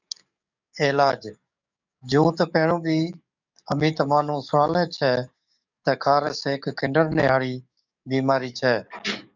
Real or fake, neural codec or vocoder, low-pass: fake; codec, 44.1 kHz, 7.8 kbps, DAC; 7.2 kHz